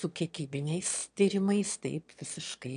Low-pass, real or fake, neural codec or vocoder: 9.9 kHz; fake; autoencoder, 22.05 kHz, a latent of 192 numbers a frame, VITS, trained on one speaker